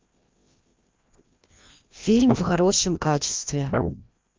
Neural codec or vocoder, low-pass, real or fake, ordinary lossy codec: codec, 16 kHz, 1 kbps, FreqCodec, larger model; 7.2 kHz; fake; Opus, 32 kbps